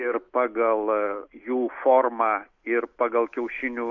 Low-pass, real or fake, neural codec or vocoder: 7.2 kHz; real; none